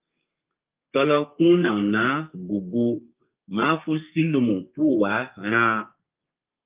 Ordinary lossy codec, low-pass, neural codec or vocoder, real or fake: Opus, 24 kbps; 3.6 kHz; codec, 32 kHz, 1.9 kbps, SNAC; fake